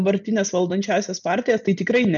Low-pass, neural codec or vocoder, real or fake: 7.2 kHz; none; real